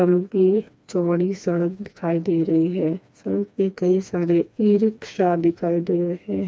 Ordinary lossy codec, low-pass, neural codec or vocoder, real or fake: none; none; codec, 16 kHz, 2 kbps, FreqCodec, smaller model; fake